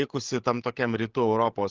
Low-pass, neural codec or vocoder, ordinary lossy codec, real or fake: 7.2 kHz; codec, 16 kHz, 16 kbps, FreqCodec, larger model; Opus, 16 kbps; fake